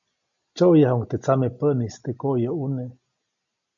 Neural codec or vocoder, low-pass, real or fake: none; 7.2 kHz; real